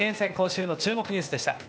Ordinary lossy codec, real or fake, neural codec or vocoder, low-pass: none; fake; codec, 16 kHz, 0.8 kbps, ZipCodec; none